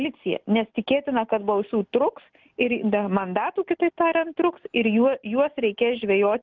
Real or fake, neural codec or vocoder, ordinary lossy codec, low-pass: real; none; Opus, 32 kbps; 7.2 kHz